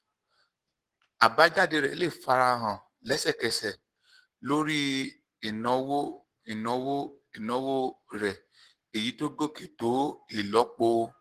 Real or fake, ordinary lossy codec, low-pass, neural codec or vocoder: fake; Opus, 16 kbps; 14.4 kHz; codec, 44.1 kHz, 7.8 kbps, Pupu-Codec